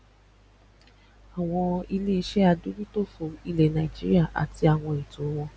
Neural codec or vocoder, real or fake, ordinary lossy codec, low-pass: none; real; none; none